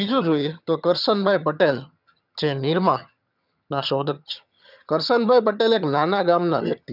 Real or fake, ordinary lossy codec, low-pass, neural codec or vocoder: fake; none; 5.4 kHz; vocoder, 22.05 kHz, 80 mel bands, HiFi-GAN